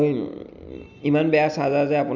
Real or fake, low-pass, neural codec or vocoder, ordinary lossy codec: real; 7.2 kHz; none; none